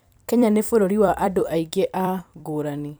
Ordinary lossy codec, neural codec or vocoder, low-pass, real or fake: none; vocoder, 44.1 kHz, 128 mel bands every 256 samples, BigVGAN v2; none; fake